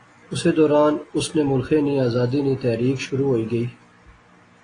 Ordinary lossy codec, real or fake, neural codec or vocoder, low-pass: AAC, 32 kbps; real; none; 9.9 kHz